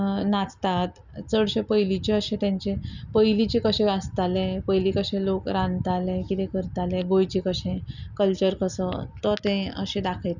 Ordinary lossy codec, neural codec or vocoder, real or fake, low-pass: none; none; real; 7.2 kHz